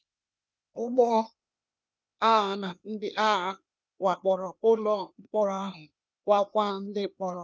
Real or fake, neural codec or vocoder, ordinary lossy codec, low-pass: fake; codec, 16 kHz, 0.8 kbps, ZipCodec; none; none